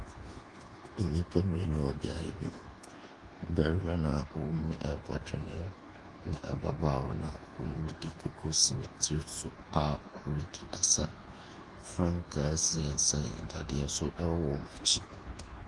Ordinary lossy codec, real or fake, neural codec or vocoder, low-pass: Opus, 24 kbps; fake; codec, 24 kHz, 1.2 kbps, DualCodec; 10.8 kHz